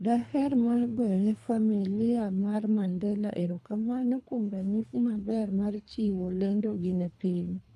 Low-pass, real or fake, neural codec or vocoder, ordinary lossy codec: none; fake; codec, 24 kHz, 3 kbps, HILCodec; none